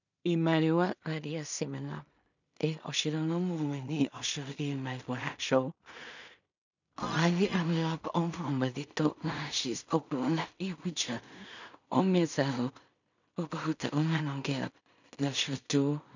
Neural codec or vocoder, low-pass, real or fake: codec, 16 kHz in and 24 kHz out, 0.4 kbps, LongCat-Audio-Codec, two codebook decoder; 7.2 kHz; fake